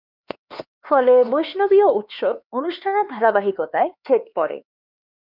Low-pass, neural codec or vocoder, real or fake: 5.4 kHz; codec, 16 kHz, 4 kbps, X-Codec, HuBERT features, trained on LibriSpeech; fake